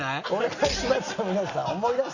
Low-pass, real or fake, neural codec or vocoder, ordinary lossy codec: 7.2 kHz; real; none; none